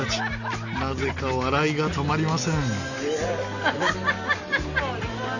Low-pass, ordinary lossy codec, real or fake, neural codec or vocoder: 7.2 kHz; none; real; none